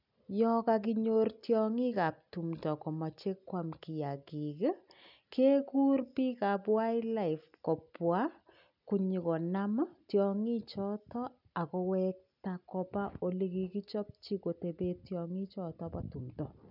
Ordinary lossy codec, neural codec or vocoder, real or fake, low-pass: none; none; real; 5.4 kHz